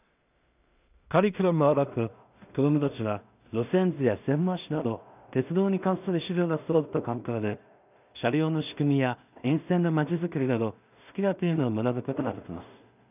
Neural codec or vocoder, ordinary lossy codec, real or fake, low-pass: codec, 16 kHz in and 24 kHz out, 0.4 kbps, LongCat-Audio-Codec, two codebook decoder; none; fake; 3.6 kHz